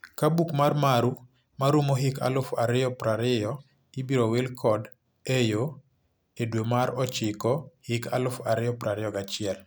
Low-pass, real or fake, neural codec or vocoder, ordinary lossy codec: none; real; none; none